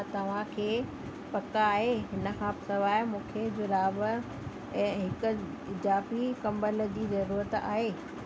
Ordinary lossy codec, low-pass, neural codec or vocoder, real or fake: none; none; none; real